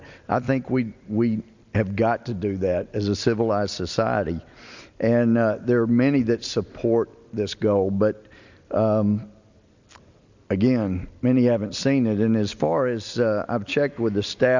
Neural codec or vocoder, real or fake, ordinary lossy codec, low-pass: none; real; Opus, 64 kbps; 7.2 kHz